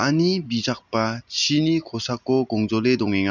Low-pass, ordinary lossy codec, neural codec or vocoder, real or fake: 7.2 kHz; none; none; real